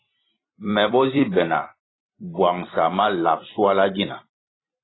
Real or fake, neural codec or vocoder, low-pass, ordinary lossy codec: fake; vocoder, 44.1 kHz, 128 mel bands every 256 samples, BigVGAN v2; 7.2 kHz; AAC, 16 kbps